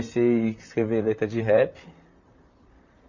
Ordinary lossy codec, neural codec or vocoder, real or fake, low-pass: none; vocoder, 44.1 kHz, 128 mel bands, Pupu-Vocoder; fake; 7.2 kHz